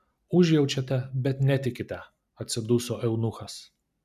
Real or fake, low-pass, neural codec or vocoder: real; 14.4 kHz; none